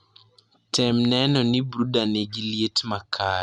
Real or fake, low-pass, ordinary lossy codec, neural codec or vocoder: real; 10.8 kHz; Opus, 64 kbps; none